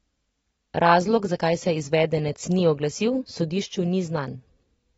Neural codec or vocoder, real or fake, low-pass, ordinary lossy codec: none; real; 19.8 kHz; AAC, 24 kbps